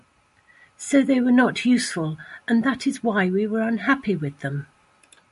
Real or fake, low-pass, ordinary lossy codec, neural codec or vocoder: real; 14.4 kHz; MP3, 48 kbps; none